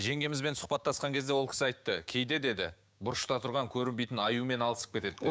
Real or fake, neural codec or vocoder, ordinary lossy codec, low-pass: fake; codec, 16 kHz, 6 kbps, DAC; none; none